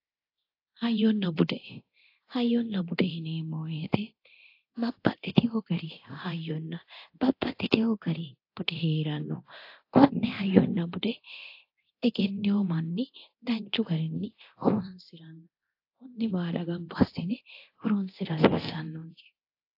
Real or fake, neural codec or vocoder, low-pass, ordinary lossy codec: fake; codec, 24 kHz, 0.9 kbps, DualCodec; 5.4 kHz; AAC, 32 kbps